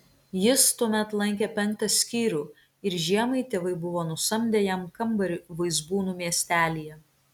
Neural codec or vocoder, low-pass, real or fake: none; 19.8 kHz; real